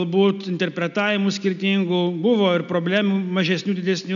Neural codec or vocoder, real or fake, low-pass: none; real; 7.2 kHz